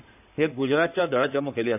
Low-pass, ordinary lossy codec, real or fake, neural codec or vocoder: 3.6 kHz; none; fake; codec, 16 kHz in and 24 kHz out, 2.2 kbps, FireRedTTS-2 codec